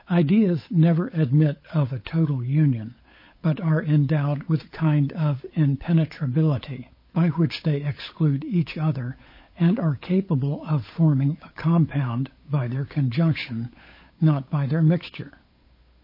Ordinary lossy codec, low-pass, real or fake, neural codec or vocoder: MP3, 24 kbps; 5.4 kHz; fake; codec, 16 kHz, 8 kbps, FunCodec, trained on Chinese and English, 25 frames a second